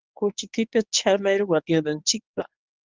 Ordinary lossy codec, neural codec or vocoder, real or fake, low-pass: Opus, 32 kbps; codec, 24 kHz, 0.9 kbps, WavTokenizer, medium speech release version 1; fake; 7.2 kHz